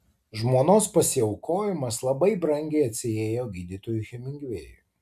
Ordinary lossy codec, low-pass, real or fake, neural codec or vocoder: AAC, 96 kbps; 14.4 kHz; real; none